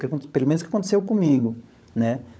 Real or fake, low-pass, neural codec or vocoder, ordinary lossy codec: fake; none; codec, 16 kHz, 16 kbps, FunCodec, trained on LibriTTS, 50 frames a second; none